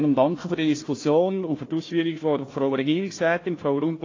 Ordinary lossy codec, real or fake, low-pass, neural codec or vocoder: AAC, 32 kbps; fake; 7.2 kHz; codec, 16 kHz, 1 kbps, FunCodec, trained on Chinese and English, 50 frames a second